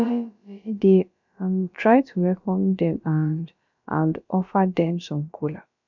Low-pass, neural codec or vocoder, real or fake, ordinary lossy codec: 7.2 kHz; codec, 16 kHz, about 1 kbps, DyCAST, with the encoder's durations; fake; none